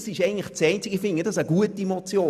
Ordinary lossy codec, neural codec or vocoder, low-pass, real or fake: none; none; 14.4 kHz; real